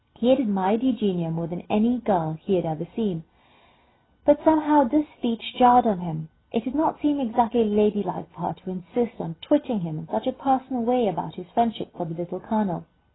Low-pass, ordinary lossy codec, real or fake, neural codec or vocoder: 7.2 kHz; AAC, 16 kbps; real; none